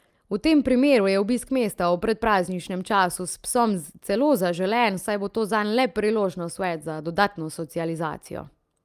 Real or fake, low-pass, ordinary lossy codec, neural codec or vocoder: real; 14.4 kHz; Opus, 32 kbps; none